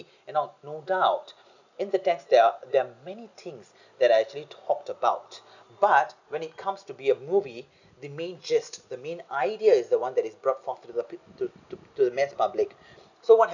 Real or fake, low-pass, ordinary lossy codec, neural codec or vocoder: real; 7.2 kHz; none; none